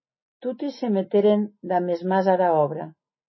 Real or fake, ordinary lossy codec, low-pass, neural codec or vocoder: real; MP3, 24 kbps; 7.2 kHz; none